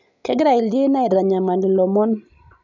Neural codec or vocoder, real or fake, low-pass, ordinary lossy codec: none; real; 7.2 kHz; none